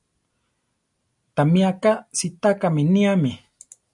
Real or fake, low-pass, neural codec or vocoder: real; 10.8 kHz; none